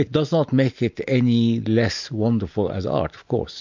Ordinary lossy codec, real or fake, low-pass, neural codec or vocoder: MP3, 64 kbps; real; 7.2 kHz; none